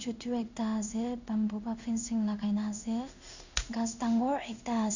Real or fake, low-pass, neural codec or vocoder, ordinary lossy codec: fake; 7.2 kHz; codec, 16 kHz in and 24 kHz out, 1 kbps, XY-Tokenizer; none